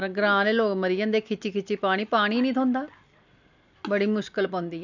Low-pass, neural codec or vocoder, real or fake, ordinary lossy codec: 7.2 kHz; none; real; none